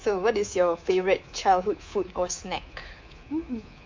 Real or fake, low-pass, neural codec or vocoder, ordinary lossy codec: fake; 7.2 kHz; codec, 24 kHz, 3.1 kbps, DualCodec; MP3, 48 kbps